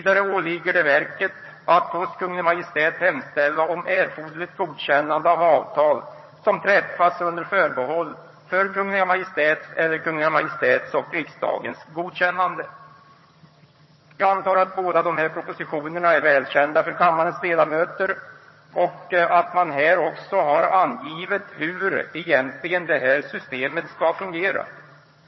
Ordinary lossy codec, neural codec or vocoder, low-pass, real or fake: MP3, 24 kbps; vocoder, 22.05 kHz, 80 mel bands, HiFi-GAN; 7.2 kHz; fake